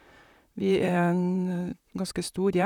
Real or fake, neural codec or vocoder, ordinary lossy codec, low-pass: fake; vocoder, 44.1 kHz, 128 mel bands, Pupu-Vocoder; none; 19.8 kHz